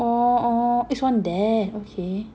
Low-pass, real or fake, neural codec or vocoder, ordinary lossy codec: none; real; none; none